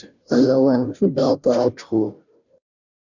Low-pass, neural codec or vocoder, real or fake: 7.2 kHz; codec, 16 kHz, 0.5 kbps, FunCodec, trained on Chinese and English, 25 frames a second; fake